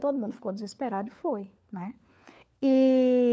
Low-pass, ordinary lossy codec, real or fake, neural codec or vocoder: none; none; fake; codec, 16 kHz, 4 kbps, FunCodec, trained on LibriTTS, 50 frames a second